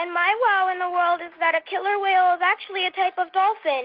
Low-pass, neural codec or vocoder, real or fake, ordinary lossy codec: 5.4 kHz; none; real; Opus, 32 kbps